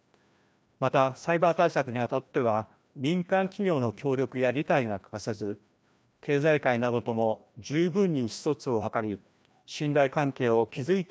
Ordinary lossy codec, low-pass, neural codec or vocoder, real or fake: none; none; codec, 16 kHz, 1 kbps, FreqCodec, larger model; fake